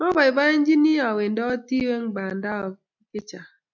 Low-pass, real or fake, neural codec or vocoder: 7.2 kHz; real; none